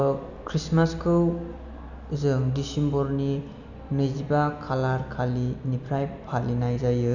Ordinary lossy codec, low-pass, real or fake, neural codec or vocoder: none; 7.2 kHz; real; none